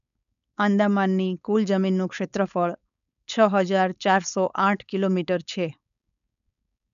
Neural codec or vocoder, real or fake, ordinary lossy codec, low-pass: codec, 16 kHz, 4.8 kbps, FACodec; fake; none; 7.2 kHz